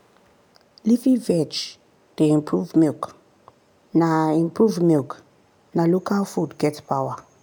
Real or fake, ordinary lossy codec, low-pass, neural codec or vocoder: real; none; 19.8 kHz; none